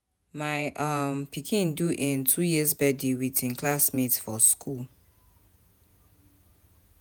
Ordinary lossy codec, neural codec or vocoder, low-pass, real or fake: none; vocoder, 48 kHz, 128 mel bands, Vocos; none; fake